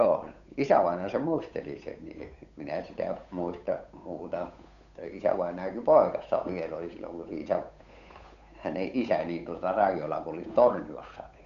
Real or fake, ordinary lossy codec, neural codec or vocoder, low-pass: fake; none; codec, 16 kHz, 8 kbps, FunCodec, trained on Chinese and English, 25 frames a second; 7.2 kHz